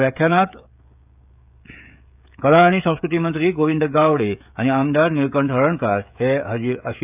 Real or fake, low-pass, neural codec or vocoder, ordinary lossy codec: fake; 3.6 kHz; codec, 16 kHz, 16 kbps, FreqCodec, smaller model; none